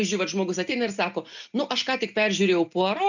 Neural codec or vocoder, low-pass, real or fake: none; 7.2 kHz; real